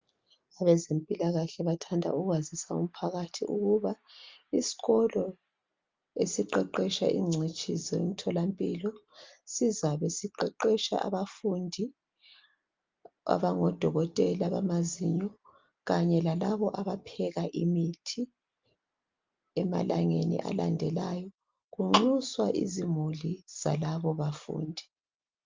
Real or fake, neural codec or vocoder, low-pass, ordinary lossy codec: real; none; 7.2 kHz; Opus, 32 kbps